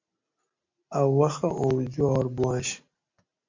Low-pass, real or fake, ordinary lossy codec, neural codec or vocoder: 7.2 kHz; real; MP3, 48 kbps; none